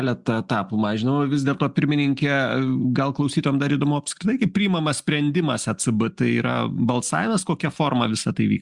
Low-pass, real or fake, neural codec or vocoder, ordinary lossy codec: 10.8 kHz; real; none; Opus, 64 kbps